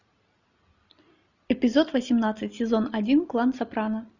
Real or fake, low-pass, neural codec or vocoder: real; 7.2 kHz; none